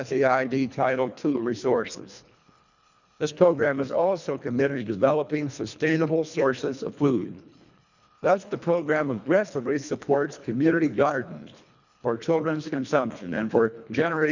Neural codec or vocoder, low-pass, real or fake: codec, 24 kHz, 1.5 kbps, HILCodec; 7.2 kHz; fake